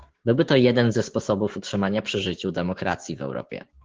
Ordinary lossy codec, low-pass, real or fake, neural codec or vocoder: Opus, 16 kbps; 7.2 kHz; fake; codec, 16 kHz, 6 kbps, DAC